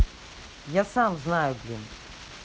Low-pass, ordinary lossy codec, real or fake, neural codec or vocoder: none; none; real; none